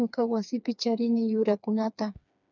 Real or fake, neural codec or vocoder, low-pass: fake; codec, 16 kHz, 4 kbps, FreqCodec, smaller model; 7.2 kHz